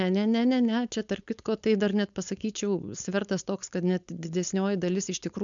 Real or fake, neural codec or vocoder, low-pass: fake; codec, 16 kHz, 4.8 kbps, FACodec; 7.2 kHz